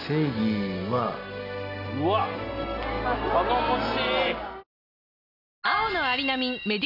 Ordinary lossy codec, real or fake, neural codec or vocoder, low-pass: none; real; none; 5.4 kHz